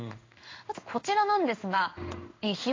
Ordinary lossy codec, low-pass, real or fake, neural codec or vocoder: AAC, 32 kbps; 7.2 kHz; fake; codec, 16 kHz in and 24 kHz out, 1 kbps, XY-Tokenizer